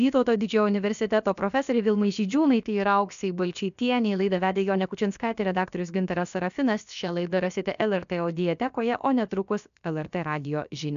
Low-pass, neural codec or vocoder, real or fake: 7.2 kHz; codec, 16 kHz, about 1 kbps, DyCAST, with the encoder's durations; fake